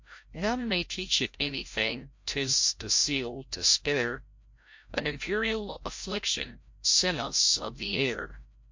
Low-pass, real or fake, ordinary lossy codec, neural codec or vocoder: 7.2 kHz; fake; MP3, 48 kbps; codec, 16 kHz, 0.5 kbps, FreqCodec, larger model